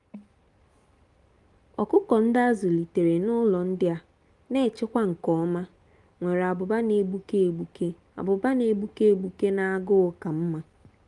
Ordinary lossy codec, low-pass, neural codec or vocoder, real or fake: Opus, 32 kbps; 10.8 kHz; none; real